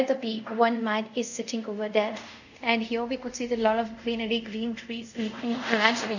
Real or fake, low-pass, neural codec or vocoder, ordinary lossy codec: fake; 7.2 kHz; codec, 24 kHz, 0.5 kbps, DualCodec; none